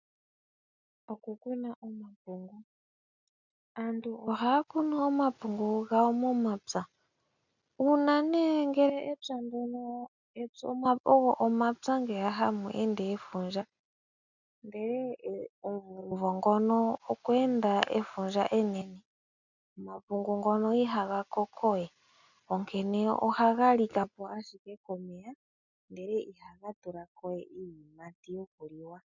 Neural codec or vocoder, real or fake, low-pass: none; real; 7.2 kHz